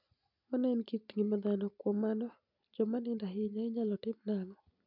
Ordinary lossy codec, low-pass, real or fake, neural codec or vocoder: none; 5.4 kHz; real; none